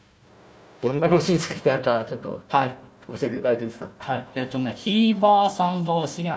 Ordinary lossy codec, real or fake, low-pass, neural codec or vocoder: none; fake; none; codec, 16 kHz, 1 kbps, FunCodec, trained on Chinese and English, 50 frames a second